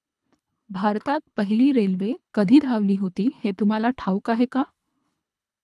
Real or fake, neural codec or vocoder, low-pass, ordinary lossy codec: fake; codec, 24 kHz, 3 kbps, HILCodec; none; none